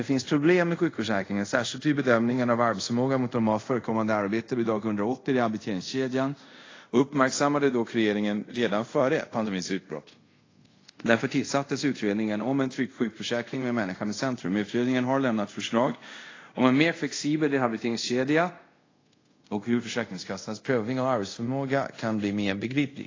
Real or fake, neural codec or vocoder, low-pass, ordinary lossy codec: fake; codec, 24 kHz, 0.5 kbps, DualCodec; 7.2 kHz; AAC, 32 kbps